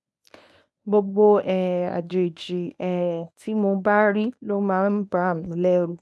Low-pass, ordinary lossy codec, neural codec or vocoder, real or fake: none; none; codec, 24 kHz, 0.9 kbps, WavTokenizer, medium speech release version 1; fake